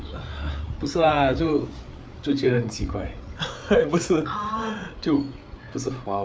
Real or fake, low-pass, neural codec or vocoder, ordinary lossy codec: fake; none; codec, 16 kHz, 8 kbps, FreqCodec, larger model; none